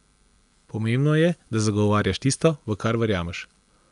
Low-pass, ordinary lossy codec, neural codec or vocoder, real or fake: 10.8 kHz; none; none; real